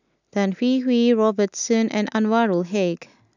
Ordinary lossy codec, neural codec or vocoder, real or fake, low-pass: none; none; real; 7.2 kHz